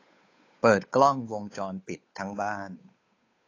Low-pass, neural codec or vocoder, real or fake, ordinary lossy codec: 7.2 kHz; codec, 16 kHz, 8 kbps, FunCodec, trained on Chinese and English, 25 frames a second; fake; AAC, 32 kbps